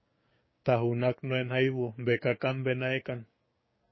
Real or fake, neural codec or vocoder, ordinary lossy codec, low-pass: fake; codec, 16 kHz, 6 kbps, DAC; MP3, 24 kbps; 7.2 kHz